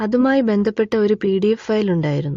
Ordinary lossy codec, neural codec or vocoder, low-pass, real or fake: AAC, 48 kbps; none; 7.2 kHz; real